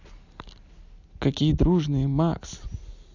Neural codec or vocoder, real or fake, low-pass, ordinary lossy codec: none; real; 7.2 kHz; Opus, 64 kbps